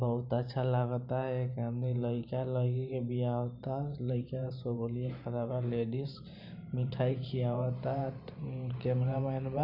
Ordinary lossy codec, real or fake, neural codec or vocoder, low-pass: none; fake; vocoder, 44.1 kHz, 128 mel bands every 512 samples, BigVGAN v2; 5.4 kHz